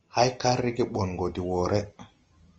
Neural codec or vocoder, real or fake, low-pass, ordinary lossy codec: none; real; 7.2 kHz; Opus, 24 kbps